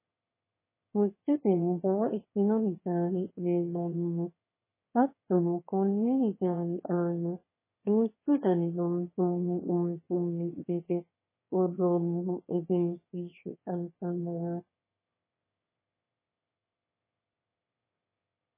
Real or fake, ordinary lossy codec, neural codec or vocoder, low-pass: fake; MP3, 16 kbps; autoencoder, 22.05 kHz, a latent of 192 numbers a frame, VITS, trained on one speaker; 3.6 kHz